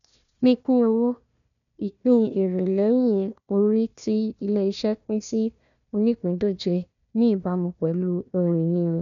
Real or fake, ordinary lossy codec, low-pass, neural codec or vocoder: fake; none; 7.2 kHz; codec, 16 kHz, 1 kbps, FunCodec, trained on Chinese and English, 50 frames a second